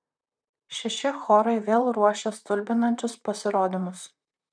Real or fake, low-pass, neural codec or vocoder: fake; 9.9 kHz; vocoder, 44.1 kHz, 128 mel bands, Pupu-Vocoder